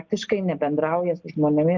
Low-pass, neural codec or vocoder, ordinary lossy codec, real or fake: 7.2 kHz; none; Opus, 24 kbps; real